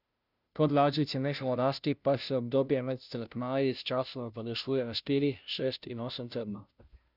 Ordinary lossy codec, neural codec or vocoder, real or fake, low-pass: none; codec, 16 kHz, 0.5 kbps, FunCodec, trained on Chinese and English, 25 frames a second; fake; 5.4 kHz